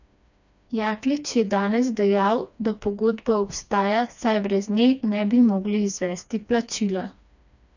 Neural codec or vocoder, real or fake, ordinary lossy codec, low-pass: codec, 16 kHz, 2 kbps, FreqCodec, smaller model; fake; none; 7.2 kHz